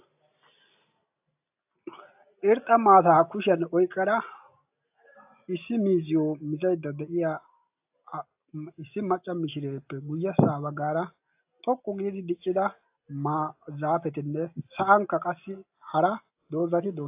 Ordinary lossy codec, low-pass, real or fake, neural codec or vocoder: AAC, 32 kbps; 3.6 kHz; real; none